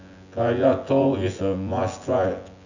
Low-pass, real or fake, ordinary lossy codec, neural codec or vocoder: 7.2 kHz; fake; none; vocoder, 24 kHz, 100 mel bands, Vocos